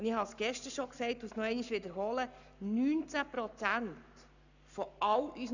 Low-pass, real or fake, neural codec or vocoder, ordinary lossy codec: 7.2 kHz; fake; codec, 44.1 kHz, 7.8 kbps, Pupu-Codec; none